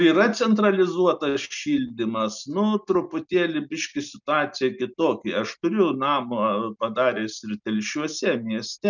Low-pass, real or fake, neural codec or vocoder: 7.2 kHz; real; none